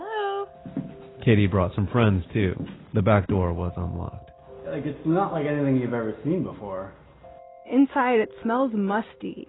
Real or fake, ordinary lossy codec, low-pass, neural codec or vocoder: real; AAC, 16 kbps; 7.2 kHz; none